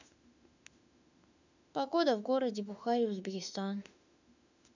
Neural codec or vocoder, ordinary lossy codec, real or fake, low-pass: autoencoder, 48 kHz, 32 numbers a frame, DAC-VAE, trained on Japanese speech; none; fake; 7.2 kHz